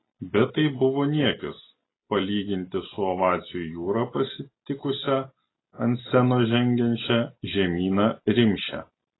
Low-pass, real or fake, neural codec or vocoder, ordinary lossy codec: 7.2 kHz; real; none; AAC, 16 kbps